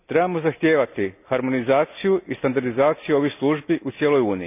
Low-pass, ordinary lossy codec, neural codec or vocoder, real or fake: 3.6 kHz; none; none; real